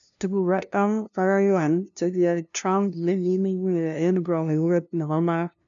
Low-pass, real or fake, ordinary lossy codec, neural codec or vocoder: 7.2 kHz; fake; none; codec, 16 kHz, 0.5 kbps, FunCodec, trained on LibriTTS, 25 frames a second